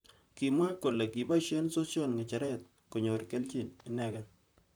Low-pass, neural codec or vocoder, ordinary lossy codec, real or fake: none; vocoder, 44.1 kHz, 128 mel bands, Pupu-Vocoder; none; fake